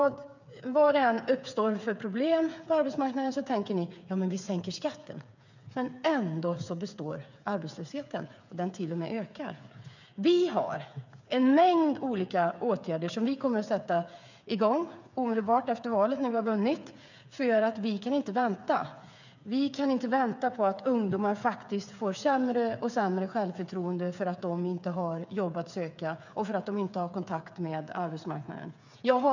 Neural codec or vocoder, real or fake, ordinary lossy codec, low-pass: codec, 16 kHz, 8 kbps, FreqCodec, smaller model; fake; none; 7.2 kHz